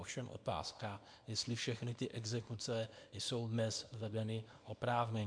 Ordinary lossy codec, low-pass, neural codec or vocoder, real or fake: MP3, 64 kbps; 9.9 kHz; codec, 24 kHz, 0.9 kbps, WavTokenizer, small release; fake